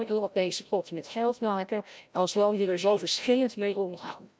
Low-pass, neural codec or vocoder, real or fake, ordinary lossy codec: none; codec, 16 kHz, 0.5 kbps, FreqCodec, larger model; fake; none